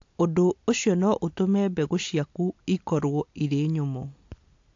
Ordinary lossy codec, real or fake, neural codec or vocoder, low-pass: AAC, 48 kbps; real; none; 7.2 kHz